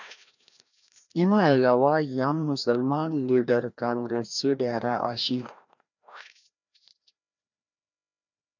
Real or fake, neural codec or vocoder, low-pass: fake; codec, 16 kHz, 1 kbps, FreqCodec, larger model; 7.2 kHz